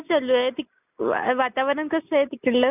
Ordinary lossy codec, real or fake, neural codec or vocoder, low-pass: none; real; none; 3.6 kHz